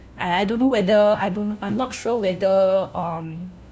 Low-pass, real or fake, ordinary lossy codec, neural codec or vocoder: none; fake; none; codec, 16 kHz, 1 kbps, FunCodec, trained on LibriTTS, 50 frames a second